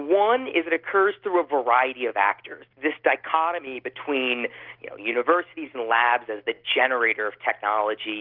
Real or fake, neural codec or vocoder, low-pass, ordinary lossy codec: real; none; 5.4 kHz; Opus, 24 kbps